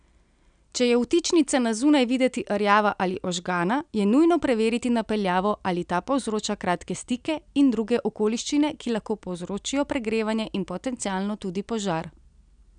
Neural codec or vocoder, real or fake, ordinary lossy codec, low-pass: none; real; none; 9.9 kHz